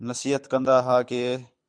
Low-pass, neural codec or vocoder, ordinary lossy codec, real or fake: 9.9 kHz; codec, 24 kHz, 6 kbps, HILCodec; MP3, 64 kbps; fake